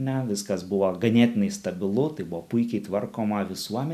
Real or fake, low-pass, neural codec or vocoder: real; 14.4 kHz; none